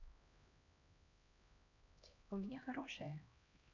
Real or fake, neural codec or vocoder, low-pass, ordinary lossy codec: fake; codec, 16 kHz, 1 kbps, X-Codec, HuBERT features, trained on LibriSpeech; 7.2 kHz; none